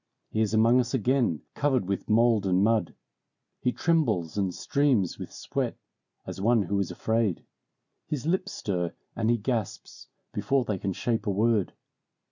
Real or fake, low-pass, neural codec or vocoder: real; 7.2 kHz; none